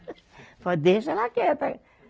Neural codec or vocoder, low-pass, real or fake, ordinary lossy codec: none; none; real; none